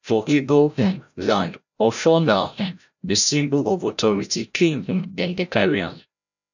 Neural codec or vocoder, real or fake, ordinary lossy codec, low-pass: codec, 16 kHz, 0.5 kbps, FreqCodec, larger model; fake; none; 7.2 kHz